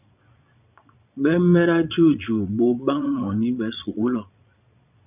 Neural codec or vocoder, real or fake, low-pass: vocoder, 22.05 kHz, 80 mel bands, Vocos; fake; 3.6 kHz